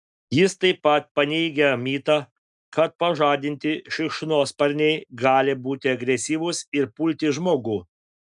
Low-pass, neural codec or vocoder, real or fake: 10.8 kHz; none; real